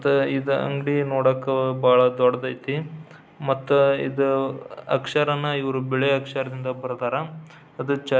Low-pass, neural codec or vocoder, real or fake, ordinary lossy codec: none; none; real; none